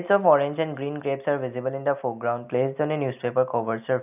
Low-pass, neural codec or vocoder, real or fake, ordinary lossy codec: 3.6 kHz; none; real; none